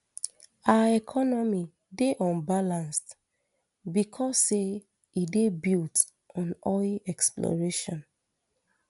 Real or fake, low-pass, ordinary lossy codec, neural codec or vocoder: real; 10.8 kHz; none; none